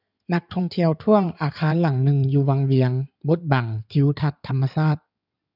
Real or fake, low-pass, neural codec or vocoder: fake; 5.4 kHz; codec, 16 kHz in and 24 kHz out, 2.2 kbps, FireRedTTS-2 codec